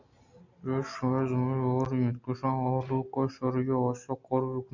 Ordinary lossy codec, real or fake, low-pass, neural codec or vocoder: MP3, 64 kbps; real; 7.2 kHz; none